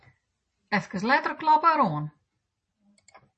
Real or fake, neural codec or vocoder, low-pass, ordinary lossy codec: real; none; 10.8 kHz; MP3, 32 kbps